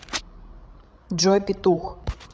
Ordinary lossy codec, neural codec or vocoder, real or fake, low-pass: none; codec, 16 kHz, 16 kbps, FreqCodec, larger model; fake; none